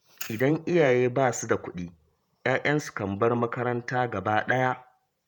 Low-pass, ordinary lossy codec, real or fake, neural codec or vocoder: none; none; fake; vocoder, 48 kHz, 128 mel bands, Vocos